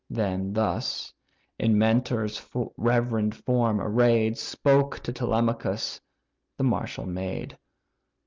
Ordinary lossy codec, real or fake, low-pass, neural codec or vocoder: Opus, 24 kbps; real; 7.2 kHz; none